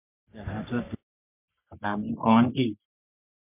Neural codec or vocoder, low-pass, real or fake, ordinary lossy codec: codec, 44.1 kHz, 3.4 kbps, Pupu-Codec; 3.6 kHz; fake; MP3, 32 kbps